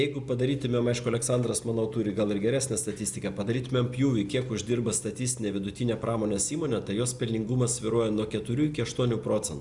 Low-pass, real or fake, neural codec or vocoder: 10.8 kHz; real; none